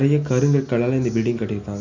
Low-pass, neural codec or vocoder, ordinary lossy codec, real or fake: 7.2 kHz; none; none; real